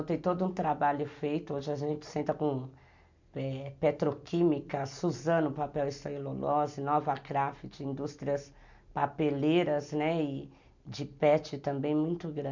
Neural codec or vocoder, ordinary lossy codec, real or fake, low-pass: none; MP3, 64 kbps; real; 7.2 kHz